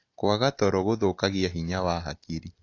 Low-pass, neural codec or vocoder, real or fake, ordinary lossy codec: 7.2 kHz; none; real; AAC, 48 kbps